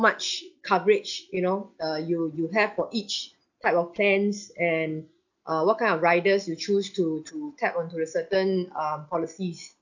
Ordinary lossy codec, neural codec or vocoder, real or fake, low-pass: none; none; real; 7.2 kHz